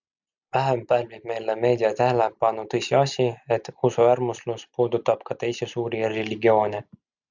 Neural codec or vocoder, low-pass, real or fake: none; 7.2 kHz; real